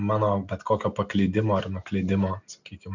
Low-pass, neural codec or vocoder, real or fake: 7.2 kHz; none; real